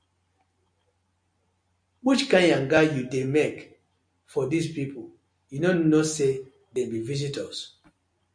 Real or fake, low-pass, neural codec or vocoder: real; 9.9 kHz; none